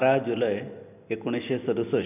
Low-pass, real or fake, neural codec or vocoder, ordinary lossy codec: 3.6 kHz; real; none; none